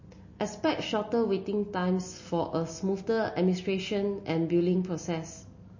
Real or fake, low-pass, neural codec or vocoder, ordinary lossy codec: real; 7.2 kHz; none; MP3, 32 kbps